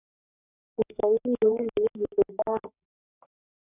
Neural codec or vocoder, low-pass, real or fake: codec, 44.1 kHz, 3.4 kbps, Pupu-Codec; 3.6 kHz; fake